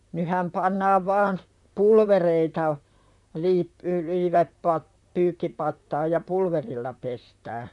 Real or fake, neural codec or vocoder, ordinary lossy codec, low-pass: fake; vocoder, 44.1 kHz, 128 mel bands, Pupu-Vocoder; none; 10.8 kHz